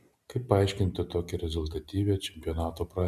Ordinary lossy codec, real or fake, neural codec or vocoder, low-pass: MP3, 96 kbps; real; none; 14.4 kHz